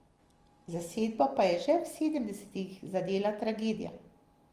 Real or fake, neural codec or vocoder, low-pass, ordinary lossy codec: real; none; 14.4 kHz; Opus, 24 kbps